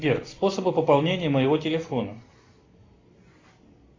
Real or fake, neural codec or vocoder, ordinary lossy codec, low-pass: real; none; AAC, 32 kbps; 7.2 kHz